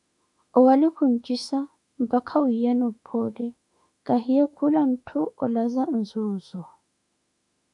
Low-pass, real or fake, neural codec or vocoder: 10.8 kHz; fake; autoencoder, 48 kHz, 32 numbers a frame, DAC-VAE, trained on Japanese speech